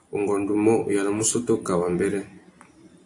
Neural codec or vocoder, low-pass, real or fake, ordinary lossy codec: vocoder, 44.1 kHz, 128 mel bands every 256 samples, BigVGAN v2; 10.8 kHz; fake; AAC, 48 kbps